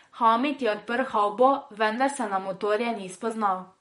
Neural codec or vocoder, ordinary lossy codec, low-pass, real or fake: vocoder, 44.1 kHz, 128 mel bands, Pupu-Vocoder; MP3, 48 kbps; 19.8 kHz; fake